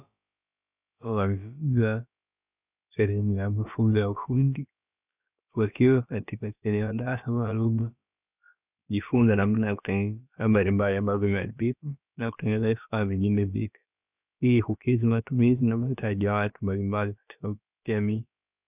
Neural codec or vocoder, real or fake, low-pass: codec, 16 kHz, about 1 kbps, DyCAST, with the encoder's durations; fake; 3.6 kHz